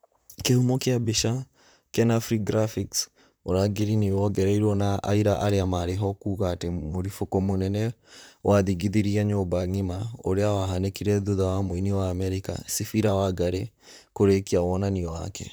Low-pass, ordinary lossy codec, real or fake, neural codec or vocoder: none; none; fake; vocoder, 44.1 kHz, 128 mel bands, Pupu-Vocoder